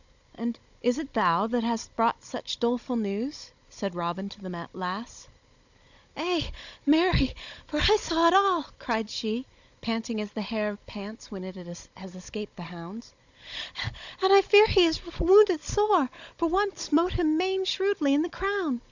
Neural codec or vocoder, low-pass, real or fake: codec, 16 kHz, 16 kbps, FunCodec, trained on Chinese and English, 50 frames a second; 7.2 kHz; fake